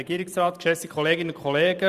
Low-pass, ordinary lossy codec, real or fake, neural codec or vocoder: 14.4 kHz; Opus, 64 kbps; fake; vocoder, 44.1 kHz, 128 mel bands every 512 samples, BigVGAN v2